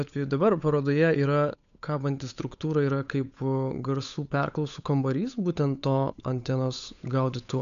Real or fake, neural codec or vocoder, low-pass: fake; codec, 16 kHz, 8 kbps, FunCodec, trained on Chinese and English, 25 frames a second; 7.2 kHz